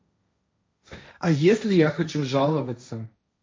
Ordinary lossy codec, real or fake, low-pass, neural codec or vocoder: none; fake; none; codec, 16 kHz, 1.1 kbps, Voila-Tokenizer